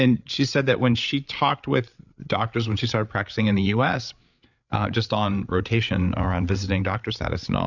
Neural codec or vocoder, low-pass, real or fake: codec, 16 kHz, 8 kbps, FreqCodec, larger model; 7.2 kHz; fake